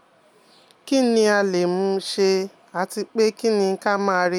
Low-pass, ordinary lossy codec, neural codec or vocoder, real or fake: 19.8 kHz; none; none; real